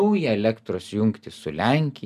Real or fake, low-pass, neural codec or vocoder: real; 14.4 kHz; none